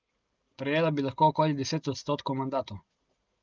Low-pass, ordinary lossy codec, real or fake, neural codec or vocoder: 7.2 kHz; Opus, 24 kbps; real; none